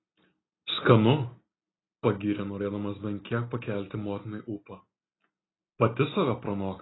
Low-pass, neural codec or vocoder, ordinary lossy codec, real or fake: 7.2 kHz; none; AAC, 16 kbps; real